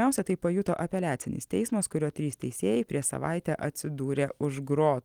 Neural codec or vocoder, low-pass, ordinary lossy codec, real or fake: none; 19.8 kHz; Opus, 24 kbps; real